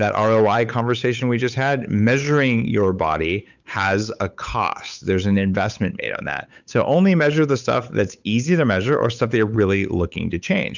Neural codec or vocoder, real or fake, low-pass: codec, 16 kHz, 8 kbps, FunCodec, trained on Chinese and English, 25 frames a second; fake; 7.2 kHz